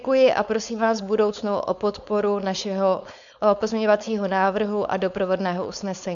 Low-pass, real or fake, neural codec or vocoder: 7.2 kHz; fake; codec, 16 kHz, 4.8 kbps, FACodec